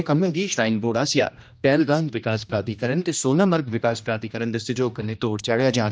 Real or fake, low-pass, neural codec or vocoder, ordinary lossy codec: fake; none; codec, 16 kHz, 1 kbps, X-Codec, HuBERT features, trained on general audio; none